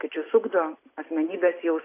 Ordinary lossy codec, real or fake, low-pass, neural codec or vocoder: AAC, 24 kbps; real; 3.6 kHz; none